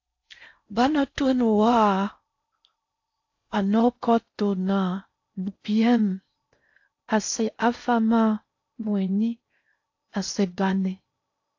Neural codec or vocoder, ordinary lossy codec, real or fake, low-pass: codec, 16 kHz in and 24 kHz out, 0.6 kbps, FocalCodec, streaming, 4096 codes; AAC, 48 kbps; fake; 7.2 kHz